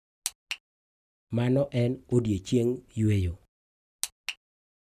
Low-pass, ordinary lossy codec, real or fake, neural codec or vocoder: 14.4 kHz; none; real; none